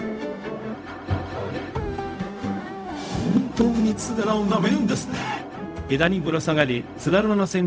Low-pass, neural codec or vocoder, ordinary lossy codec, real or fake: none; codec, 16 kHz, 0.4 kbps, LongCat-Audio-Codec; none; fake